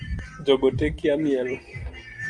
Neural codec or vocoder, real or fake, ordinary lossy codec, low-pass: none; real; Opus, 32 kbps; 9.9 kHz